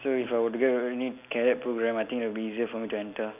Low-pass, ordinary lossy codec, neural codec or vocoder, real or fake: 3.6 kHz; none; none; real